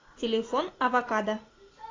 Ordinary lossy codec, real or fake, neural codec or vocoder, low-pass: AAC, 32 kbps; real; none; 7.2 kHz